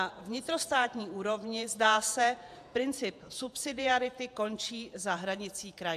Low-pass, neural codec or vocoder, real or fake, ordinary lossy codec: 14.4 kHz; vocoder, 48 kHz, 128 mel bands, Vocos; fake; AAC, 96 kbps